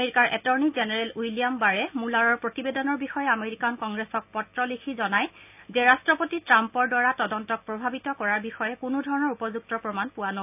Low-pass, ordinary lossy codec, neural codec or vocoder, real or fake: 3.6 kHz; none; none; real